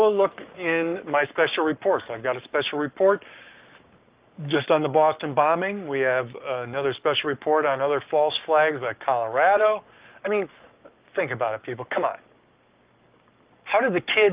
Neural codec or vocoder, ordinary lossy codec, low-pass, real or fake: codec, 44.1 kHz, 7.8 kbps, Pupu-Codec; Opus, 24 kbps; 3.6 kHz; fake